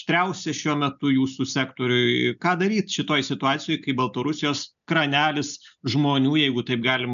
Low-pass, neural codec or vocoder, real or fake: 7.2 kHz; none; real